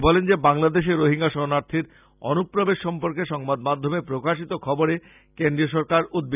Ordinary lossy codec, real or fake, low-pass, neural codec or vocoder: none; real; 3.6 kHz; none